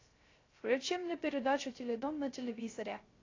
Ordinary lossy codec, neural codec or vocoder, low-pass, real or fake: AAC, 32 kbps; codec, 16 kHz, 0.3 kbps, FocalCodec; 7.2 kHz; fake